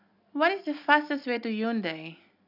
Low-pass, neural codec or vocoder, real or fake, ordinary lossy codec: 5.4 kHz; none; real; none